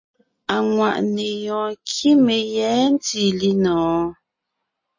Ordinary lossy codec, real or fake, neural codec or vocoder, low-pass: MP3, 32 kbps; real; none; 7.2 kHz